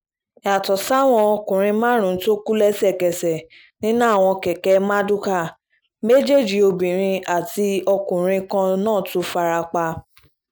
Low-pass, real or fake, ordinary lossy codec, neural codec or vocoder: none; real; none; none